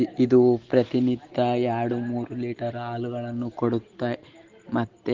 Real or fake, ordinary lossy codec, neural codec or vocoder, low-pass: real; Opus, 16 kbps; none; 7.2 kHz